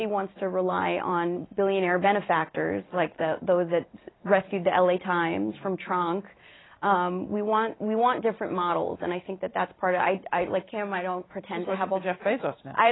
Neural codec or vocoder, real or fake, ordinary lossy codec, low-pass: none; real; AAC, 16 kbps; 7.2 kHz